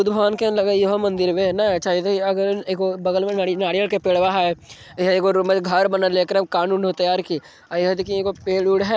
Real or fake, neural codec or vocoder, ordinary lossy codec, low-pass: real; none; none; none